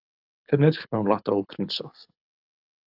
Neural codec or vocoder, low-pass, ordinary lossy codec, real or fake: codec, 24 kHz, 6 kbps, HILCodec; 5.4 kHz; AAC, 48 kbps; fake